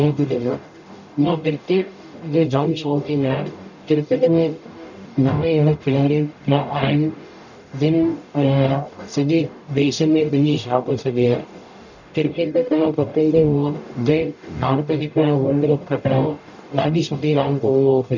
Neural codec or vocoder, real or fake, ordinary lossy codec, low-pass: codec, 44.1 kHz, 0.9 kbps, DAC; fake; none; 7.2 kHz